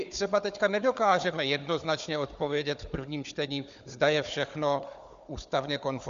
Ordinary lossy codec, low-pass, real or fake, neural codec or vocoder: MP3, 64 kbps; 7.2 kHz; fake; codec, 16 kHz, 4 kbps, FunCodec, trained on Chinese and English, 50 frames a second